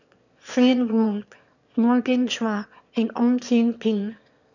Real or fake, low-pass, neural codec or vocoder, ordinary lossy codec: fake; 7.2 kHz; autoencoder, 22.05 kHz, a latent of 192 numbers a frame, VITS, trained on one speaker; none